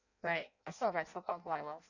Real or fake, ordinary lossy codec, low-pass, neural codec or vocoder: fake; none; 7.2 kHz; codec, 16 kHz in and 24 kHz out, 0.6 kbps, FireRedTTS-2 codec